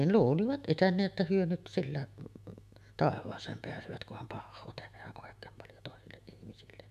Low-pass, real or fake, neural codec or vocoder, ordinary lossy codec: 14.4 kHz; fake; autoencoder, 48 kHz, 128 numbers a frame, DAC-VAE, trained on Japanese speech; none